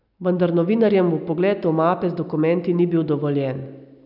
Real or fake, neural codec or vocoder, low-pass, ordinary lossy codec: real; none; 5.4 kHz; none